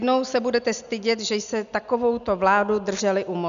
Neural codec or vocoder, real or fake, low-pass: none; real; 7.2 kHz